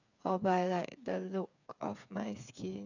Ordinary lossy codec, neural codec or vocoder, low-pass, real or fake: none; codec, 16 kHz, 8 kbps, FreqCodec, smaller model; 7.2 kHz; fake